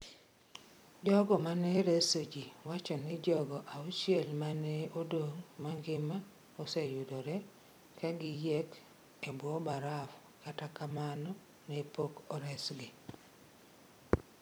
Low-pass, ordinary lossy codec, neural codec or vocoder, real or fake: none; none; vocoder, 44.1 kHz, 128 mel bands every 256 samples, BigVGAN v2; fake